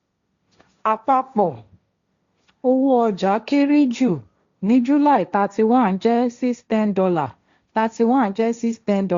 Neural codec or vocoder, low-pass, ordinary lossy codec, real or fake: codec, 16 kHz, 1.1 kbps, Voila-Tokenizer; 7.2 kHz; Opus, 64 kbps; fake